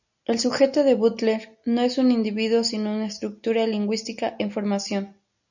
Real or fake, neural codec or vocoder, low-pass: real; none; 7.2 kHz